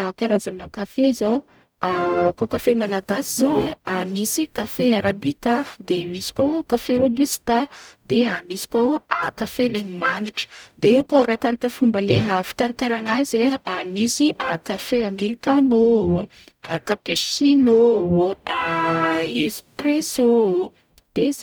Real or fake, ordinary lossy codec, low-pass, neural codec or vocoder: fake; none; none; codec, 44.1 kHz, 0.9 kbps, DAC